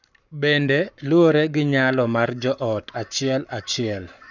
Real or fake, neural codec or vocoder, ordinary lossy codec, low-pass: fake; codec, 44.1 kHz, 7.8 kbps, Pupu-Codec; none; 7.2 kHz